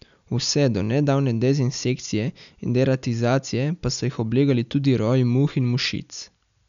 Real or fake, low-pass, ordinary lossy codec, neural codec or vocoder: real; 7.2 kHz; none; none